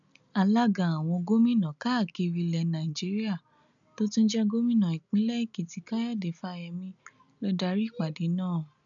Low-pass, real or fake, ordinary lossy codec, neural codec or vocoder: 7.2 kHz; real; none; none